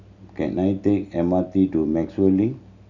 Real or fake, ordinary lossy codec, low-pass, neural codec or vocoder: real; Opus, 64 kbps; 7.2 kHz; none